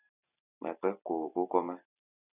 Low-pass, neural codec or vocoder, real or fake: 3.6 kHz; none; real